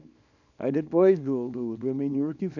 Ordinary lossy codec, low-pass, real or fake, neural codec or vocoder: none; 7.2 kHz; fake; codec, 24 kHz, 0.9 kbps, WavTokenizer, small release